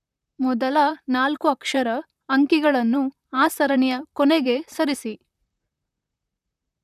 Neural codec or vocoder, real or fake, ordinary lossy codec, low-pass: vocoder, 44.1 kHz, 128 mel bands, Pupu-Vocoder; fake; none; 14.4 kHz